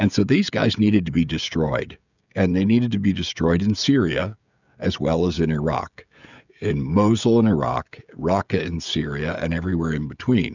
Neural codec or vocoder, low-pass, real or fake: codec, 16 kHz, 16 kbps, FreqCodec, smaller model; 7.2 kHz; fake